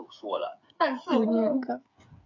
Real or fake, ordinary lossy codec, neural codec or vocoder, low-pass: fake; MP3, 64 kbps; codec, 16 kHz, 8 kbps, FreqCodec, smaller model; 7.2 kHz